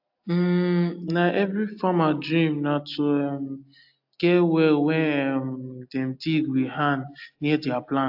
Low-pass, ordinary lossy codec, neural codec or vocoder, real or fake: 5.4 kHz; AAC, 48 kbps; none; real